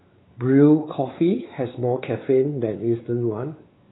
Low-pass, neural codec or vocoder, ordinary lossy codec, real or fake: 7.2 kHz; codec, 16 kHz, 4 kbps, X-Codec, WavLM features, trained on Multilingual LibriSpeech; AAC, 16 kbps; fake